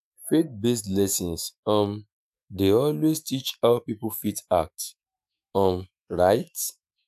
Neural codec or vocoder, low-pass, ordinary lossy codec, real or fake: autoencoder, 48 kHz, 128 numbers a frame, DAC-VAE, trained on Japanese speech; 14.4 kHz; AAC, 96 kbps; fake